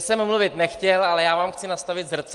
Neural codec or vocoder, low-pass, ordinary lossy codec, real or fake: none; 10.8 kHz; Opus, 24 kbps; real